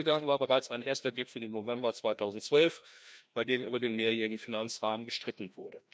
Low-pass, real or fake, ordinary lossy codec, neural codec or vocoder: none; fake; none; codec, 16 kHz, 1 kbps, FreqCodec, larger model